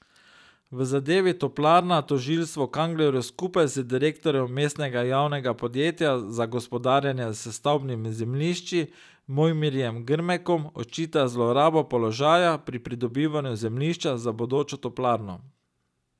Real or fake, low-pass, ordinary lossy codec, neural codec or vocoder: real; none; none; none